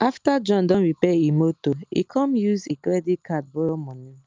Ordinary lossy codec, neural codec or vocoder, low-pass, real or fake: Opus, 24 kbps; none; 7.2 kHz; real